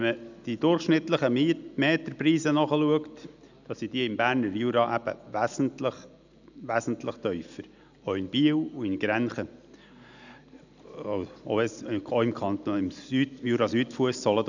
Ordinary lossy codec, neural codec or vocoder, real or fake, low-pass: none; none; real; 7.2 kHz